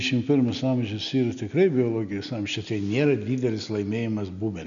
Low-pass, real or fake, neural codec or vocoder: 7.2 kHz; real; none